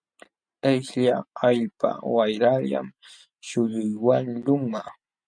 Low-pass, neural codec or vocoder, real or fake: 9.9 kHz; none; real